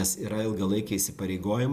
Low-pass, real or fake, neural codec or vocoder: 14.4 kHz; real; none